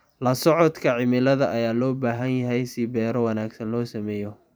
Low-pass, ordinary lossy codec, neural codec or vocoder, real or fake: none; none; vocoder, 44.1 kHz, 128 mel bands every 512 samples, BigVGAN v2; fake